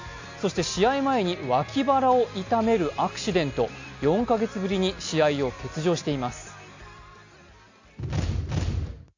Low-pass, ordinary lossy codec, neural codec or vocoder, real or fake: 7.2 kHz; AAC, 48 kbps; none; real